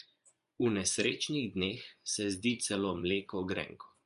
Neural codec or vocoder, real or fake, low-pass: vocoder, 24 kHz, 100 mel bands, Vocos; fake; 10.8 kHz